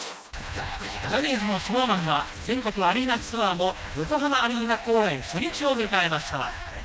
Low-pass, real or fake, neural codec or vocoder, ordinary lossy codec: none; fake; codec, 16 kHz, 1 kbps, FreqCodec, smaller model; none